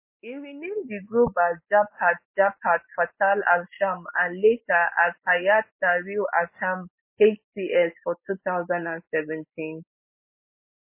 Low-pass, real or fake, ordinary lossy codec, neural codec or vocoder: 3.6 kHz; real; MP3, 24 kbps; none